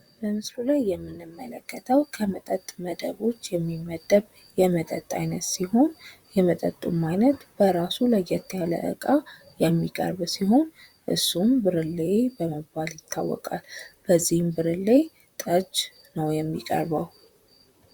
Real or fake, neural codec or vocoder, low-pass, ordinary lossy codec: fake; vocoder, 44.1 kHz, 128 mel bands, Pupu-Vocoder; 19.8 kHz; Opus, 64 kbps